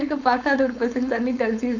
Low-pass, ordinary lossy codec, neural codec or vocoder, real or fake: 7.2 kHz; none; codec, 16 kHz, 4.8 kbps, FACodec; fake